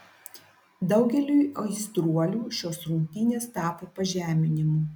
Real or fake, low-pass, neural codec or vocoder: real; 19.8 kHz; none